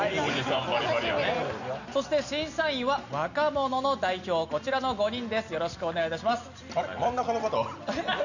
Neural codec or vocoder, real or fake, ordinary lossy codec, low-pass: none; real; none; 7.2 kHz